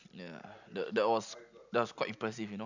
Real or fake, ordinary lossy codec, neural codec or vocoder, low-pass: real; none; none; 7.2 kHz